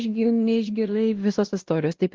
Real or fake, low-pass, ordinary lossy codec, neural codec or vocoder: fake; 7.2 kHz; Opus, 16 kbps; codec, 16 kHz, 1 kbps, X-Codec, WavLM features, trained on Multilingual LibriSpeech